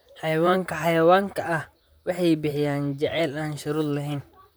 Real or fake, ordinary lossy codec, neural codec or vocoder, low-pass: fake; none; vocoder, 44.1 kHz, 128 mel bands, Pupu-Vocoder; none